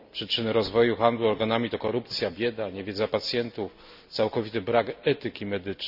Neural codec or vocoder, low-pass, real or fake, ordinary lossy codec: none; 5.4 kHz; real; none